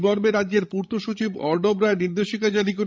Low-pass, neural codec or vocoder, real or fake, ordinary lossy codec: none; codec, 16 kHz, 16 kbps, FreqCodec, larger model; fake; none